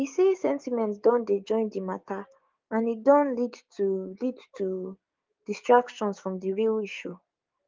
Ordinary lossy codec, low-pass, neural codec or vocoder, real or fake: Opus, 32 kbps; 7.2 kHz; codec, 16 kHz, 6 kbps, DAC; fake